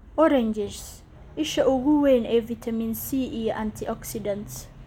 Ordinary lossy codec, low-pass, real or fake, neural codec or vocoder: none; 19.8 kHz; real; none